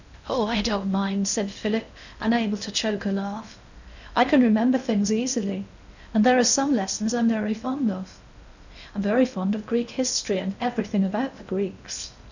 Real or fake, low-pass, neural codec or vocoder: fake; 7.2 kHz; codec, 16 kHz in and 24 kHz out, 0.8 kbps, FocalCodec, streaming, 65536 codes